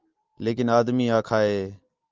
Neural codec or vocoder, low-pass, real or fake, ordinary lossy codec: none; 7.2 kHz; real; Opus, 24 kbps